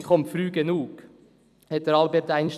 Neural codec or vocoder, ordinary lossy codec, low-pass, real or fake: vocoder, 44.1 kHz, 128 mel bands every 256 samples, BigVGAN v2; none; 14.4 kHz; fake